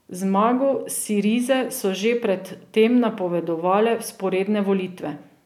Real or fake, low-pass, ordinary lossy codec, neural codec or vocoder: real; 19.8 kHz; none; none